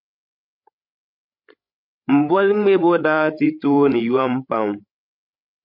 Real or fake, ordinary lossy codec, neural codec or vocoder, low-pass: fake; MP3, 48 kbps; codec, 16 kHz, 16 kbps, FreqCodec, larger model; 5.4 kHz